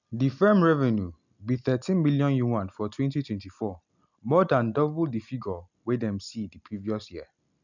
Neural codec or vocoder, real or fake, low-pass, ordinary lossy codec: none; real; 7.2 kHz; none